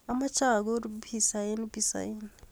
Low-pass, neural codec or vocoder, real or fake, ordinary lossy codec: none; none; real; none